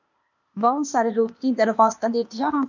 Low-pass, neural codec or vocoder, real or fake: 7.2 kHz; codec, 16 kHz, 0.8 kbps, ZipCodec; fake